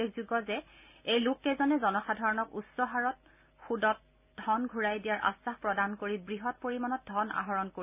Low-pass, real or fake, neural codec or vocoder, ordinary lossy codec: 3.6 kHz; real; none; none